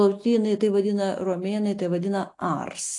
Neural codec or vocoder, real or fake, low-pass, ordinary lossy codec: none; real; 10.8 kHz; AAC, 48 kbps